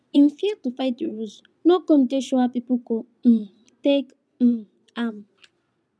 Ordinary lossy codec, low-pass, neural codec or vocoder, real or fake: none; none; vocoder, 22.05 kHz, 80 mel bands, Vocos; fake